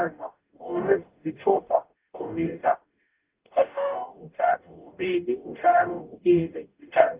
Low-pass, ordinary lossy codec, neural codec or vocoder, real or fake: 3.6 kHz; Opus, 32 kbps; codec, 44.1 kHz, 0.9 kbps, DAC; fake